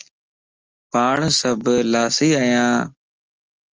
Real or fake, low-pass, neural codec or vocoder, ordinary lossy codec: real; 7.2 kHz; none; Opus, 24 kbps